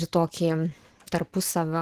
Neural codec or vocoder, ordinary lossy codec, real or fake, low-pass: none; Opus, 16 kbps; real; 14.4 kHz